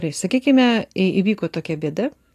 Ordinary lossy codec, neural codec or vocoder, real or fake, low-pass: AAC, 64 kbps; none; real; 14.4 kHz